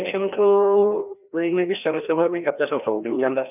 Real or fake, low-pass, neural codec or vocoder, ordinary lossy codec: fake; 3.6 kHz; codec, 16 kHz, 1 kbps, FreqCodec, larger model; none